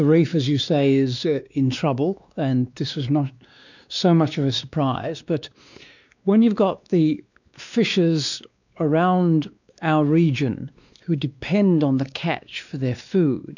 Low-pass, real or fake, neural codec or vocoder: 7.2 kHz; fake; codec, 16 kHz, 2 kbps, X-Codec, WavLM features, trained on Multilingual LibriSpeech